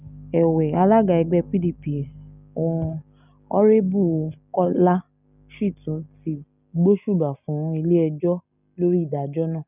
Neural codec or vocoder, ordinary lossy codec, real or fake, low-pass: none; none; real; 3.6 kHz